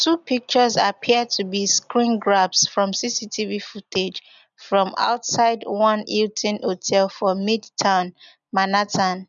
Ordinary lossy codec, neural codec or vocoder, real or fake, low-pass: none; none; real; 7.2 kHz